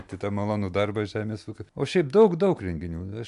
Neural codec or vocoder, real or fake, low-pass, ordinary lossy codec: none; real; 10.8 kHz; AAC, 96 kbps